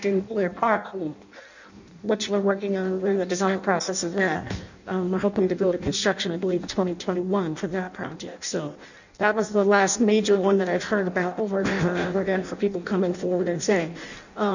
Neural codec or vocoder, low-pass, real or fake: codec, 16 kHz in and 24 kHz out, 0.6 kbps, FireRedTTS-2 codec; 7.2 kHz; fake